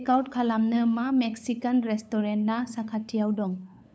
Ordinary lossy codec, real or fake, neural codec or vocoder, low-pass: none; fake; codec, 16 kHz, 8 kbps, FunCodec, trained on LibriTTS, 25 frames a second; none